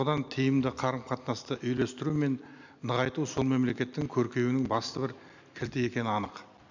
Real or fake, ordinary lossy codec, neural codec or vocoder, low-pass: real; none; none; 7.2 kHz